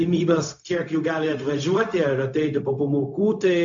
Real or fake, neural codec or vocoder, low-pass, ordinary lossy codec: fake; codec, 16 kHz, 0.4 kbps, LongCat-Audio-Codec; 7.2 kHz; AAC, 64 kbps